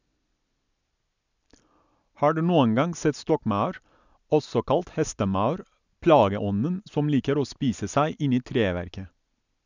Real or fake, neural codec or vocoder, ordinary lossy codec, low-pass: real; none; none; 7.2 kHz